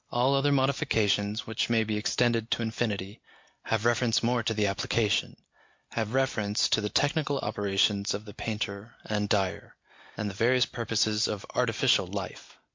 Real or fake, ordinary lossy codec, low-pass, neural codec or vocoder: real; MP3, 48 kbps; 7.2 kHz; none